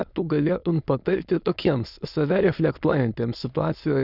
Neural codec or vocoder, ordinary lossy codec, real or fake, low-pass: autoencoder, 22.05 kHz, a latent of 192 numbers a frame, VITS, trained on many speakers; Opus, 64 kbps; fake; 5.4 kHz